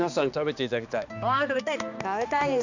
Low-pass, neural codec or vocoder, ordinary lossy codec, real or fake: 7.2 kHz; codec, 16 kHz, 2 kbps, X-Codec, HuBERT features, trained on balanced general audio; none; fake